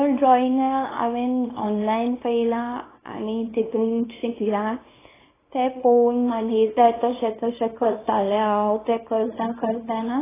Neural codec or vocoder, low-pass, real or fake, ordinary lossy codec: codec, 24 kHz, 0.9 kbps, WavTokenizer, small release; 3.6 kHz; fake; AAC, 16 kbps